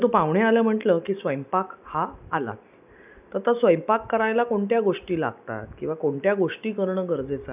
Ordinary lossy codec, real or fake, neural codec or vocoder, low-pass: none; real; none; 3.6 kHz